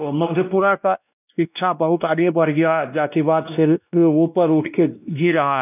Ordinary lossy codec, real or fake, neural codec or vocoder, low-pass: none; fake; codec, 16 kHz, 1 kbps, X-Codec, WavLM features, trained on Multilingual LibriSpeech; 3.6 kHz